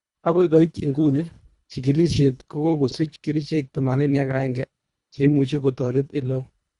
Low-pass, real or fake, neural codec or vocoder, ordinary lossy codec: 10.8 kHz; fake; codec, 24 kHz, 1.5 kbps, HILCodec; Opus, 64 kbps